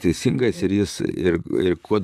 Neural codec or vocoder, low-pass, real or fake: vocoder, 44.1 kHz, 128 mel bands, Pupu-Vocoder; 14.4 kHz; fake